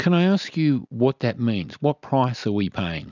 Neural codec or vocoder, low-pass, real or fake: none; 7.2 kHz; real